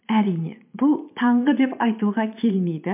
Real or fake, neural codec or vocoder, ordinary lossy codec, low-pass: fake; vocoder, 22.05 kHz, 80 mel bands, Vocos; MP3, 32 kbps; 3.6 kHz